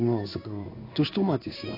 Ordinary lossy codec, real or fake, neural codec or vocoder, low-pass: AAC, 24 kbps; fake; codec, 16 kHz in and 24 kHz out, 1 kbps, XY-Tokenizer; 5.4 kHz